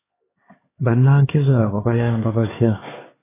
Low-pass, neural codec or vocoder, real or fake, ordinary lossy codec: 3.6 kHz; codec, 16 kHz, 4 kbps, X-Codec, HuBERT features, trained on LibriSpeech; fake; AAC, 16 kbps